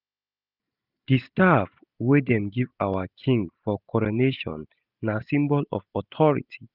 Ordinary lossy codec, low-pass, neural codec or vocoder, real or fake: none; 5.4 kHz; none; real